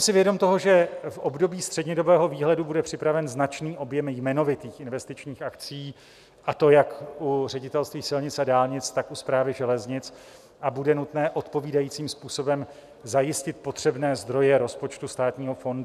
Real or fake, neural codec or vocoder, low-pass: real; none; 14.4 kHz